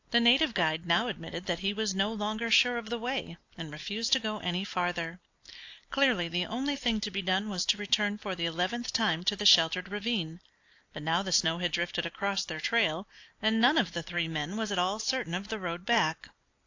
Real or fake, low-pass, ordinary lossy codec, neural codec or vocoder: real; 7.2 kHz; AAC, 48 kbps; none